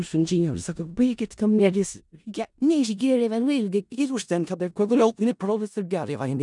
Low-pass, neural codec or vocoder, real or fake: 10.8 kHz; codec, 16 kHz in and 24 kHz out, 0.4 kbps, LongCat-Audio-Codec, four codebook decoder; fake